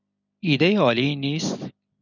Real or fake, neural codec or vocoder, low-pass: real; none; 7.2 kHz